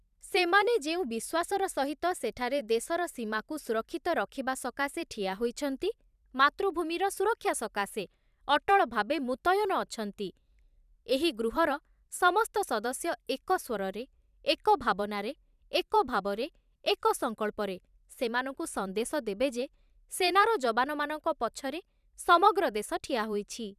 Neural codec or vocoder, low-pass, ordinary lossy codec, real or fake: vocoder, 44.1 kHz, 128 mel bands every 512 samples, BigVGAN v2; 14.4 kHz; none; fake